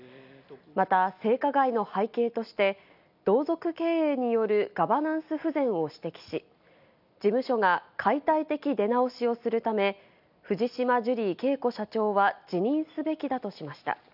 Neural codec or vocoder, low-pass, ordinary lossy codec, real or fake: none; 5.4 kHz; none; real